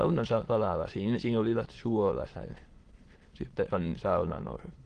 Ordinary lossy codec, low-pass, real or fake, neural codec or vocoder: Opus, 16 kbps; 9.9 kHz; fake; autoencoder, 22.05 kHz, a latent of 192 numbers a frame, VITS, trained on many speakers